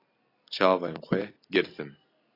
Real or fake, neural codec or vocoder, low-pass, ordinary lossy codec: real; none; 5.4 kHz; AAC, 24 kbps